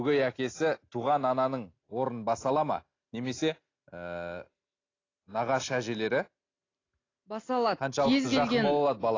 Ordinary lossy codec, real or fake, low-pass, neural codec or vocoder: AAC, 32 kbps; real; 7.2 kHz; none